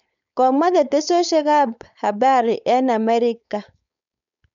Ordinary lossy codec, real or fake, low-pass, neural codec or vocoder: none; fake; 7.2 kHz; codec, 16 kHz, 4.8 kbps, FACodec